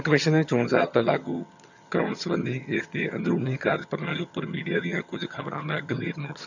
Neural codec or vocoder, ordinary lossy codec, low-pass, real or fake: vocoder, 22.05 kHz, 80 mel bands, HiFi-GAN; none; 7.2 kHz; fake